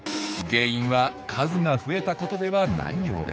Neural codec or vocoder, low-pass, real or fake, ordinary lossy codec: codec, 16 kHz, 4 kbps, X-Codec, HuBERT features, trained on general audio; none; fake; none